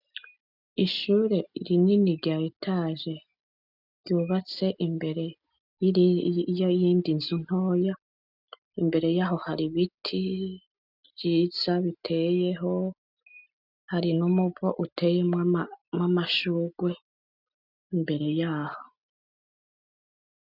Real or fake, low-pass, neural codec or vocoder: real; 5.4 kHz; none